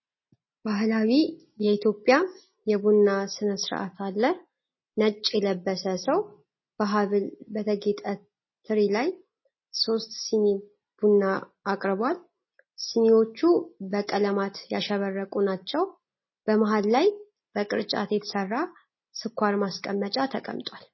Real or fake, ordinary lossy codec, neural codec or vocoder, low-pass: real; MP3, 24 kbps; none; 7.2 kHz